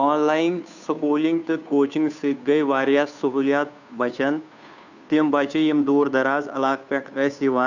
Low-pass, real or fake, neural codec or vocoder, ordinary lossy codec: 7.2 kHz; fake; codec, 16 kHz, 2 kbps, FunCodec, trained on Chinese and English, 25 frames a second; none